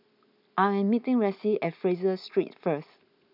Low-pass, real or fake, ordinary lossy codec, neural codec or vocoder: 5.4 kHz; real; none; none